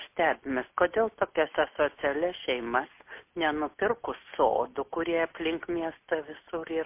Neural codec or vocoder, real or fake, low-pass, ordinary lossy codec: none; real; 3.6 kHz; MP3, 24 kbps